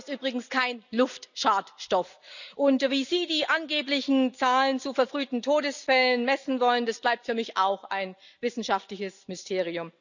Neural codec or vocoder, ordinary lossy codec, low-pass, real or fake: none; none; 7.2 kHz; real